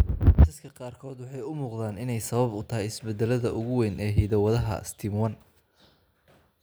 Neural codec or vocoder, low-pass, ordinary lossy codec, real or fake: none; none; none; real